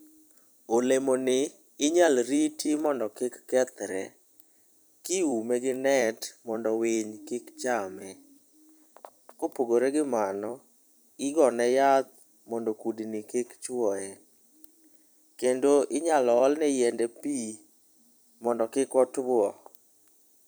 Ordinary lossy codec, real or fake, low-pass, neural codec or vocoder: none; fake; none; vocoder, 44.1 kHz, 128 mel bands every 256 samples, BigVGAN v2